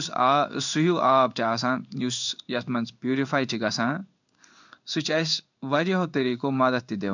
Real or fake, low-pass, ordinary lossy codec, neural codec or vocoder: fake; 7.2 kHz; none; codec, 16 kHz in and 24 kHz out, 1 kbps, XY-Tokenizer